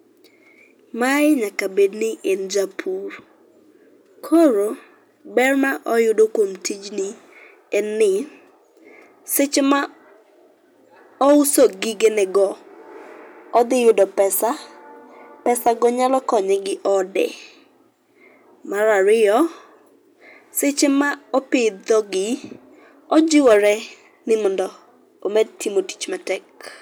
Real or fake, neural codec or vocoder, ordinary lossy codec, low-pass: real; none; none; none